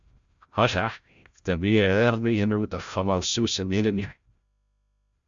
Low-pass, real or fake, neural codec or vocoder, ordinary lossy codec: 7.2 kHz; fake; codec, 16 kHz, 0.5 kbps, FreqCodec, larger model; Opus, 64 kbps